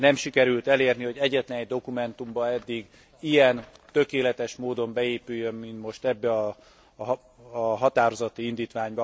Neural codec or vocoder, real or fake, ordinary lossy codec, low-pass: none; real; none; none